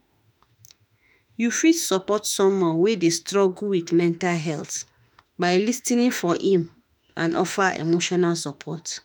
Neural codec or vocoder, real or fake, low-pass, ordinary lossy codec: autoencoder, 48 kHz, 32 numbers a frame, DAC-VAE, trained on Japanese speech; fake; none; none